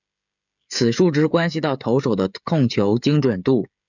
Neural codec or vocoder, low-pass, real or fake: codec, 16 kHz, 16 kbps, FreqCodec, smaller model; 7.2 kHz; fake